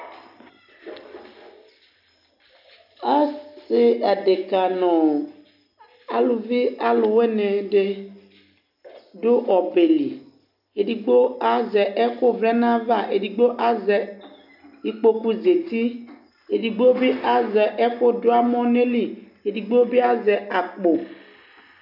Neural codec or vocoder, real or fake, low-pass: none; real; 5.4 kHz